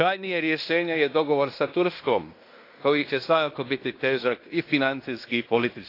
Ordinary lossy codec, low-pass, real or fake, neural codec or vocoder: AAC, 32 kbps; 5.4 kHz; fake; codec, 16 kHz in and 24 kHz out, 0.9 kbps, LongCat-Audio-Codec, fine tuned four codebook decoder